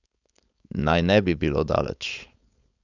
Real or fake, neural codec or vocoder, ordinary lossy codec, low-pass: fake; codec, 16 kHz, 4.8 kbps, FACodec; none; 7.2 kHz